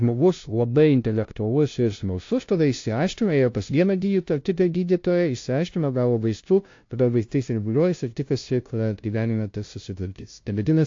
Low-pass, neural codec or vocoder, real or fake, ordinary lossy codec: 7.2 kHz; codec, 16 kHz, 0.5 kbps, FunCodec, trained on LibriTTS, 25 frames a second; fake; AAC, 48 kbps